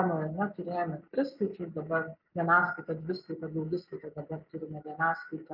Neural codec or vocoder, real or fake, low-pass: none; real; 5.4 kHz